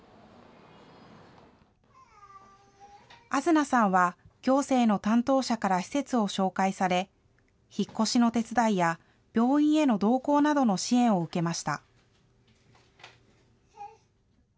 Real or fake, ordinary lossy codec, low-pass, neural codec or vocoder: real; none; none; none